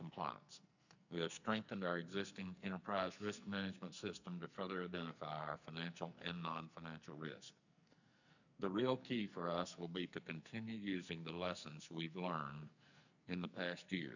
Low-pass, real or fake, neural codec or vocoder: 7.2 kHz; fake; codec, 44.1 kHz, 2.6 kbps, SNAC